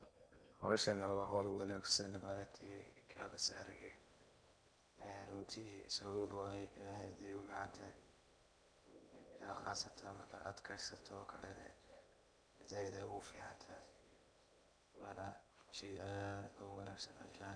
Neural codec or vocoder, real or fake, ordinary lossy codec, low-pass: codec, 16 kHz in and 24 kHz out, 0.8 kbps, FocalCodec, streaming, 65536 codes; fake; none; 9.9 kHz